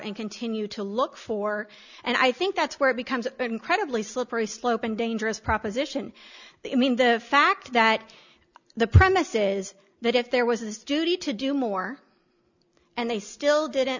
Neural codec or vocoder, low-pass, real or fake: none; 7.2 kHz; real